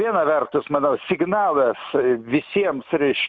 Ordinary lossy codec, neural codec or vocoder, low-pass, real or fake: Opus, 64 kbps; none; 7.2 kHz; real